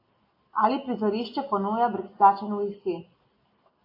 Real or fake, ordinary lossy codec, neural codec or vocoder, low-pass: real; AAC, 32 kbps; none; 5.4 kHz